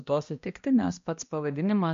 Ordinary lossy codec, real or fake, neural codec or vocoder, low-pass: MP3, 48 kbps; fake; codec, 16 kHz, 1 kbps, X-Codec, HuBERT features, trained on balanced general audio; 7.2 kHz